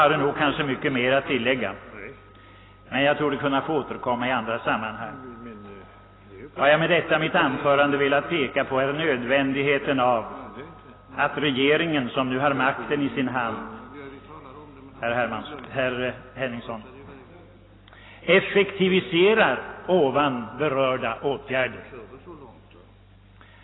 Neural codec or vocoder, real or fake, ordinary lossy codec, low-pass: none; real; AAC, 16 kbps; 7.2 kHz